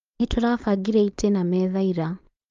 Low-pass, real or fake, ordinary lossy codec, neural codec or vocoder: 7.2 kHz; fake; Opus, 32 kbps; codec, 16 kHz, 4.8 kbps, FACodec